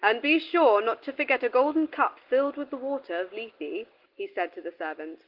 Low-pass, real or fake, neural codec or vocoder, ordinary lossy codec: 5.4 kHz; real; none; Opus, 16 kbps